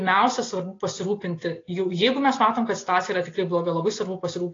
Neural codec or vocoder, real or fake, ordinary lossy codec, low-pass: none; real; AAC, 32 kbps; 7.2 kHz